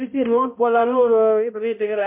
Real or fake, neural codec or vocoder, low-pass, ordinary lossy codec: fake; codec, 16 kHz, 0.5 kbps, X-Codec, HuBERT features, trained on balanced general audio; 3.6 kHz; MP3, 32 kbps